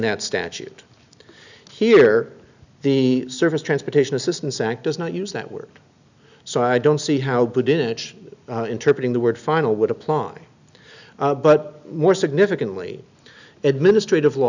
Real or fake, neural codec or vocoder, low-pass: real; none; 7.2 kHz